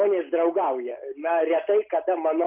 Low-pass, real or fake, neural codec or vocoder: 3.6 kHz; real; none